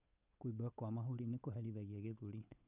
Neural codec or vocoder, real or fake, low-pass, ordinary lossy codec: codec, 16 kHz, 8 kbps, FunCodec, trained on Chinese and English, 25 frames a second; fake; 3.6 kHz; none